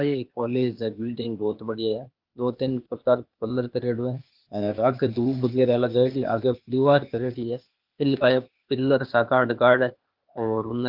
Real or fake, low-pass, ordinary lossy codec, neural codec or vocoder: fake; 5.4 kHz; Opus, 24 kbps; codec, 16 kHz, 0.8 kbps, ZipCodec